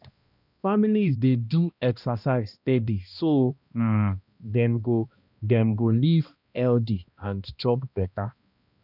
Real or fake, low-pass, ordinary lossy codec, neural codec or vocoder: fake; 5.4 kHz; none; codec, 16 kHz, 1 kbps, X-Codec, HuBERT features, trained on balanced general audio